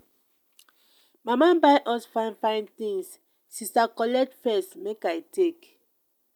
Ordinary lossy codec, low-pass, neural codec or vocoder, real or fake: none; 19.8 kHz; none; real